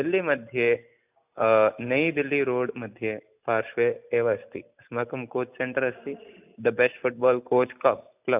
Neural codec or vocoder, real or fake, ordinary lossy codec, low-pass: none; real; none; 3.6 kHz